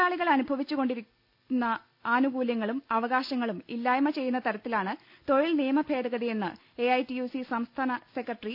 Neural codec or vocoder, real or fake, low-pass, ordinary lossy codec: none; real; 5.4 kHz; none